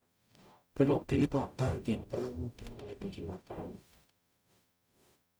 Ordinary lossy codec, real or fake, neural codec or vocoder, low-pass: none; fake; codec, 44.1 kHz, 0.9 kbps, DAC; none